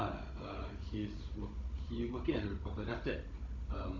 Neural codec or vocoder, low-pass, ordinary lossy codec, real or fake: codec, 16 kHz, 16 kbps, FunCodec, trained on Chinese and English, 50 frames a second; 7.2 kHz; none; fake